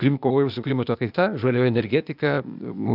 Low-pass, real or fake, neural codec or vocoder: 5.4 kHz; fake; codec, 16 kHz, 0.8 kbps, ZipCodec